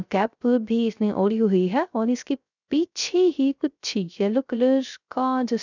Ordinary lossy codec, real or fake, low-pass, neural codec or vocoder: none; fake; 7.2 kHz; codec, 16 kHz, 0.3 kbps, FocalCodec